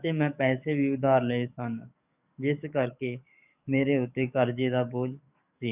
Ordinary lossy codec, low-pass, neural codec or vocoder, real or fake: none; 3.6 kHz; codec, 44.1 kHz, 7.8 kbps, DAC; fake